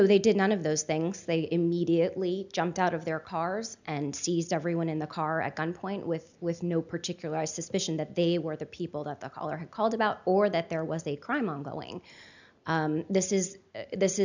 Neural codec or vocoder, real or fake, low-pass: none; real; 7.2 kHz